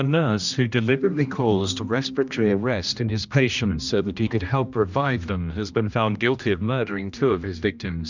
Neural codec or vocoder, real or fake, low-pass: codec, 16 kHz, 1 kbps, X-Codec, HuBERT features, trained on general audio; fake; 7.2 kHz